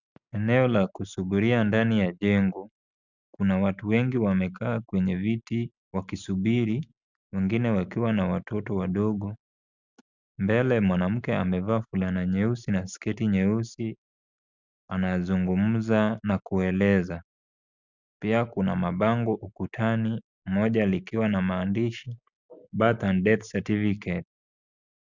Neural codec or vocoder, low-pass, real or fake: none; 7.2 kHz; real